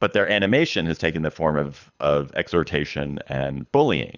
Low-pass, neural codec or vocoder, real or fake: 7.2 kHz; codec, 24 kHz, 6 kbps, HILCodec; fake